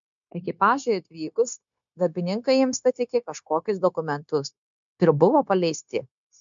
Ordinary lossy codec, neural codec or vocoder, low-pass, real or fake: MP3, 64 kbps; codec, 16 kHz, 0.9 kbps, LongCat-Audio-Codec; 7.2 kHz; fake